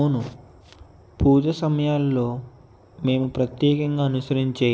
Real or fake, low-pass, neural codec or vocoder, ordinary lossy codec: real; none; none; none